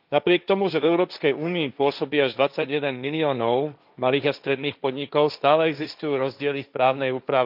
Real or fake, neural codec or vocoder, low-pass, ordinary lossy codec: fake; codec, 16 kHz, 1.1 kbps, Voila-Tokenizer; 5.4 kHz; none